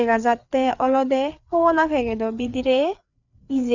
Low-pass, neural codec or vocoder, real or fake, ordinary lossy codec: 7.2 kHz; codec, 16 kHz, 4 kbps, FreqCodec, larger model; fake; none